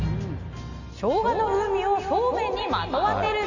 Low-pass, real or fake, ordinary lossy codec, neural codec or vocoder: 7.2 kHz; real; none; none